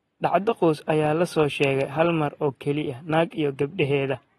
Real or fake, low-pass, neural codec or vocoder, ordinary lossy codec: real; 19.8 kHz; none; AAC, 32 kbps